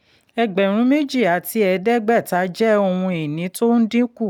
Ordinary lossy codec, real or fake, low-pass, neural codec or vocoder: none; real; 19.8 kHz; none